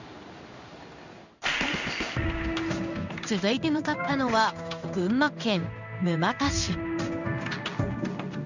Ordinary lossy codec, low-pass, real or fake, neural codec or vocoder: none; 7.2 kHz; fake; codec, 16 kHz in and 24 kHz out, 1 kbps, XY-Tokenizer